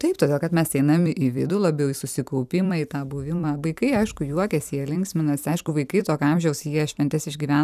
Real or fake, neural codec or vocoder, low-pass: fake; vocoder, 44.1 kHz, 128 mel bands every 256 samples, BigVGAN v2; 14.4 kHz